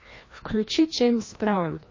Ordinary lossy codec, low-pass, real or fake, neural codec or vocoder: MP3, 32 kbps; 7.2 kHz; fake; codec, 16 kHz, 1 kbps, FreqCodec, larger model